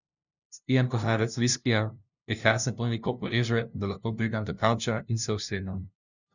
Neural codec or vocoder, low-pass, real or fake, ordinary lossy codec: codec, 16 kHz, 0.5 kbps, FunCodec, trained on LibriTTS, 25 frames a second; 7.2 kHz; fake; none